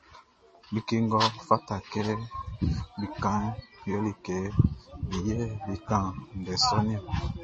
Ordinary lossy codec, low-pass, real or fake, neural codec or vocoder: MP3, 32 kbps; 10.8 kHz; real; none